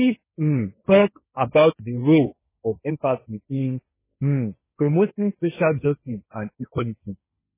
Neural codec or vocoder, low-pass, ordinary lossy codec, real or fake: codec, 16 kHz, 1.1 kbps, Voila-Tokenizer; 3.6 kHz; MP3, 16 kbps; fake